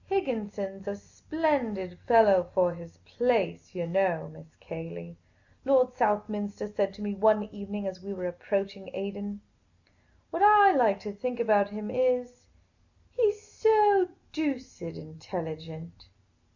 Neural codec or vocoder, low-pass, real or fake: none; 7.2 kHz; real